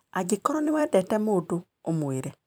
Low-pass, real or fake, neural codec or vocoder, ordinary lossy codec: none; fake; vocoder, 44.1 kHz, 128 mel bands every 256 samples, BigVGAN v2; none